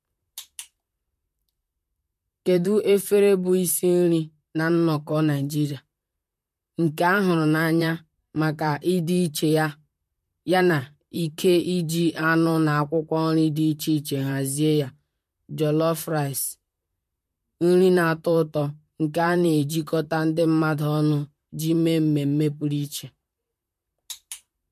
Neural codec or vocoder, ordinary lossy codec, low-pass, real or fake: vocoder, 44.1 kHz, 128 mel bands, Pupu-Vocoder; MP3, 64 kbps; 14.4 kHz; fake